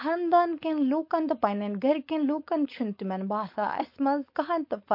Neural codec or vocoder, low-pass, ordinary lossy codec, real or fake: codec, 16 kHz, 4.8 kbps, FACodec; 5.4 kHz; MP3, 32 kbps; fake